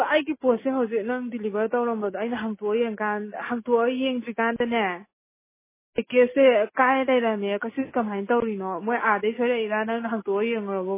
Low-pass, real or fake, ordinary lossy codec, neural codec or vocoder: 3.6 kHz; real; MP3, 16 kbps; none